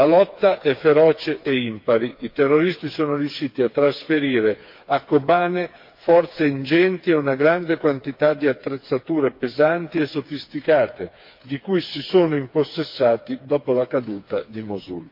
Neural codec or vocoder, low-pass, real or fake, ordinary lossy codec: codec, 16 kHz, 4 kbps, FreqCodec, smaller model; 5.4 kHz; fake; MP3, 32 kbps